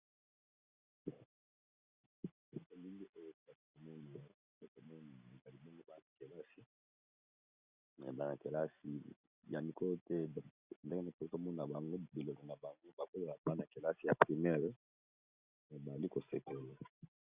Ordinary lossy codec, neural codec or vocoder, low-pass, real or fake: Opus, 64 kbps; none; 3.6 kHz; real